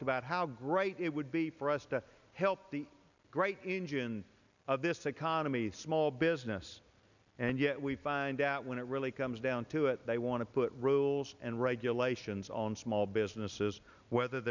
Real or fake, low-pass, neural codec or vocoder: real; 7.2 kHz; none